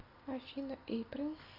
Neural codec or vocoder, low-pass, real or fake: none; 5.4 kHz; real